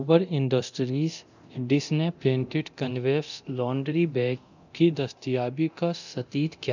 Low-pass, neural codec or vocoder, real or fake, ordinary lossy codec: 7.2 kHz; codec, 24 kHz, 0.9 kbps, DualCodec; fake; none